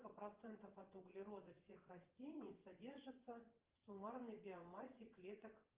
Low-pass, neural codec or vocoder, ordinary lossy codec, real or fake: 3.6 kHz; vocoder, 22.05 kHz, 80 mel bands, WaveNeXt; Opus, 24 kbps; fake